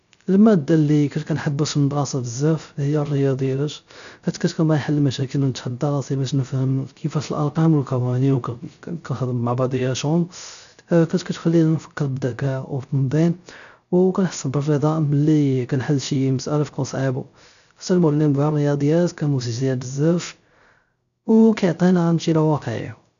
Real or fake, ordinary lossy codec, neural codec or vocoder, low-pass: fake; none; codec, 16 kHz, 0.3 kbps, FocalCodec; 7.2 kHz